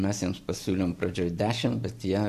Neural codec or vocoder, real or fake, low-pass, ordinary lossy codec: none; real; 14.4 kHz; AAC, 96 kbps